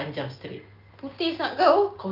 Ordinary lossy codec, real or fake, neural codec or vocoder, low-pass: Opus, 24 kbps; real; none; 5.4 kHz